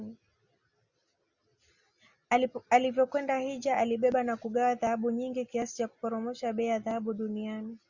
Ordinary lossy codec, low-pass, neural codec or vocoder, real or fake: Opus, 64 kbps; 7.2 kHz; none; real